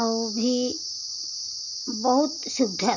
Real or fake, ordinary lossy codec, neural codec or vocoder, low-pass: real; none; none; 7.2 kHz